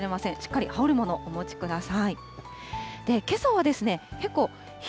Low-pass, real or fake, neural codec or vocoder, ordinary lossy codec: none; real; none; none